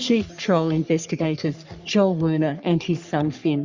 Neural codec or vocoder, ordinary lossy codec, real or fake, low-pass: codec, 44.1 kHz, 3.4 kbps, Pupu-Codec; Opus, 64 kbps; fake; 7.2 kHz